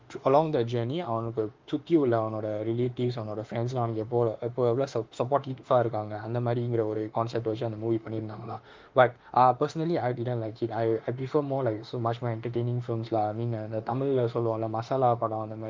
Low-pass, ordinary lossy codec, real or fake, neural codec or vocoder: 7.2 kHz; Opus, 32 kbps; fake; autoencoder, 48 kHz, 32 numbers a frame, DAC-VAE, trained on Japanese speech